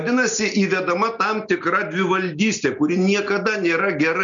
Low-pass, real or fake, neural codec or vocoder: 7.2 kHz; real; none